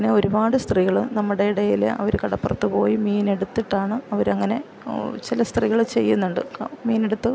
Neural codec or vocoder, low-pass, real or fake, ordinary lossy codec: none; none; real; none